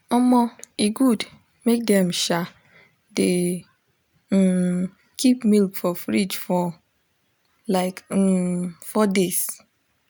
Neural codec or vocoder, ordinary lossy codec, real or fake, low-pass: none; none; real; none